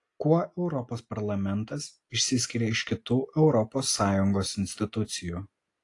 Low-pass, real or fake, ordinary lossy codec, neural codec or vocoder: 10.8 kHz; real; AAC, 48 kbps; none